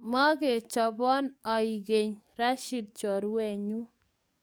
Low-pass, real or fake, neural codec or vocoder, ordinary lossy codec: none; fake; codec, 44.1 kHz, 7.8 kbps, DAC; none